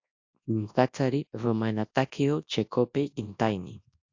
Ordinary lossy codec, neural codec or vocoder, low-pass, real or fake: AAC, 48 kbps; codec, 24 kHz, 0.9 kbps, WavTokenizer, large speech release; 7.2 kHz; fake